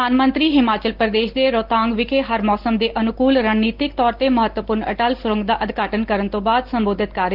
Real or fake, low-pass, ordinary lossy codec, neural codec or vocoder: real; 5.4 kHz; Opus, 16 kbps; none